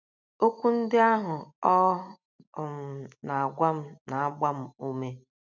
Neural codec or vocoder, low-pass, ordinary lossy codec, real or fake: none; 7.2 kHz; none; real